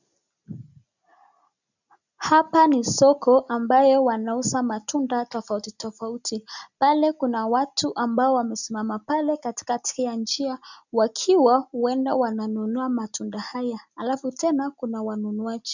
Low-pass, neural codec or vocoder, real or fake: 7.2 kHz; none; real